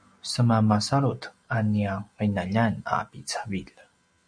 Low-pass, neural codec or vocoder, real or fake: 9.9 kHz; none; real